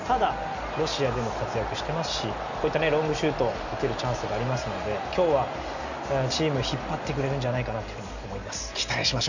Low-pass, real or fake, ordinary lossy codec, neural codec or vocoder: 7.2 kHz; real; none; none